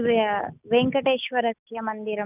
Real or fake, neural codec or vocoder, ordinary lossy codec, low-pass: real; none; none; 3.6 kHz